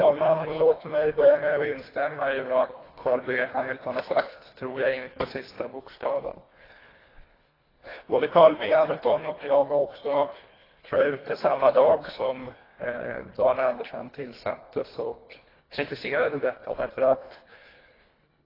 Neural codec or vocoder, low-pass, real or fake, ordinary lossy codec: codec, 24 kHz, 1.5 kbps, HILCodec; 5.4 kHz; fake; AAC, 24 kbps